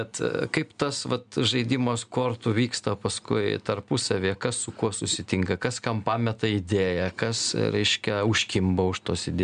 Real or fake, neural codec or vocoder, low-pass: real; none; 9.9 kHz